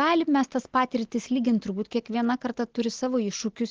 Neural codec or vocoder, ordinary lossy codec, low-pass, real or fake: none; Opus, 16 kbps; 7.2 kHz; real